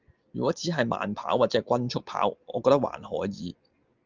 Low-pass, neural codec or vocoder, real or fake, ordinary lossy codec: 7.2 kHz; none; real; Opus, 24 kbps